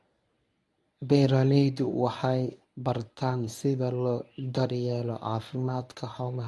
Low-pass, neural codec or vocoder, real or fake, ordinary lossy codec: none; codec, 24 kHz, 0.9 kbps, WavTokenizer, medium speech release version 1; fake; none